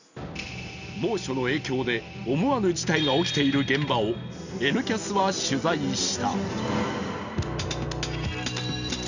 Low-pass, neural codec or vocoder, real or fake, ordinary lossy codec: 7.2 kHz; none; real; none